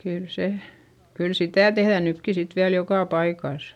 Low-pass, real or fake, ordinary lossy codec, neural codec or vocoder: 19.8 kHz; real; none; none